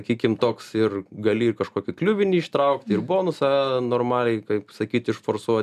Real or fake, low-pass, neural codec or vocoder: real; 14.4 kHz; none